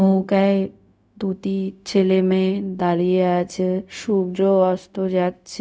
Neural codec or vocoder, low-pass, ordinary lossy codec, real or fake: codec, 16 kHz, 0.4 kbps, LongCat-Audio-Codec; none; none; fake